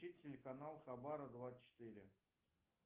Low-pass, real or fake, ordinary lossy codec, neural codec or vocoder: 3.6 kHz; real; Opus, 32 kbps; none